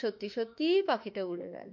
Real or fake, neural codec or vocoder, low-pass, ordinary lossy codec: fake; autoencoder, 48 kHz, 32 numbers a frame, DAC-VAE, trained on Japanese speech; 7.2 kHz; MP3, 48 kbps